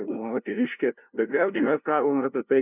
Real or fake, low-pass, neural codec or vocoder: fake; 3.6 kHz; codec, 16 kHz, 0.5 kbps, FunCodec, trained on LibriTTS, 25 frames a second